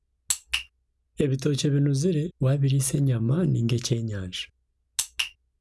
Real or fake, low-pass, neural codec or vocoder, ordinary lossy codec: real; none; none; none